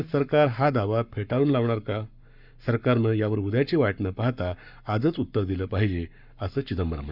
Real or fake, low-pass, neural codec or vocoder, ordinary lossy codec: fake; 5.4 kHz; codec, 44.1 kHz, 7.8 kbps, Pupu-Codec; none